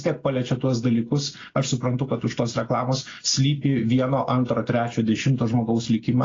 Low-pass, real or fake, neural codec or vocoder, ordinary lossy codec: 7.2 kHz; real; none; AAC, 32 kbps